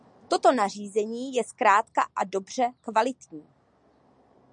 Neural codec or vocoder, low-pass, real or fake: none; 9.9 kHz; real